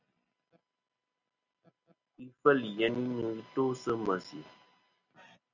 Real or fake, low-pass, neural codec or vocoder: real; 7.2 kHz; none